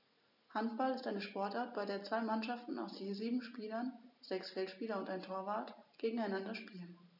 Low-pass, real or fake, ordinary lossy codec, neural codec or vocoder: 5.4 kHz; real; none; none